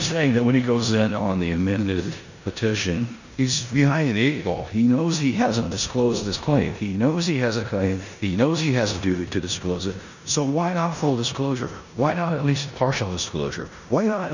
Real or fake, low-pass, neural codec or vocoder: fake; 7.2 kHz; codec, 16 kHz in and 24 kHz out, 0.9 kbps, LongCat-Audio-Codec, four codebook decoder